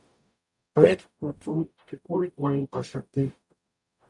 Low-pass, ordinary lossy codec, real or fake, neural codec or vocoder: 10.8 kHz; MP3, 96 kbps; fake; codec, 44.1 kHz, 0.9 kbps, DAC